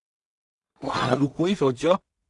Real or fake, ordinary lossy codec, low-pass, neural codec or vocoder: fake; Opus, 64 kbps; 10.8 kHz; codec, 16 kHz in and 24 kHz out, 0.4 kbps, LongCat-Audio-Codec, two codebook decoder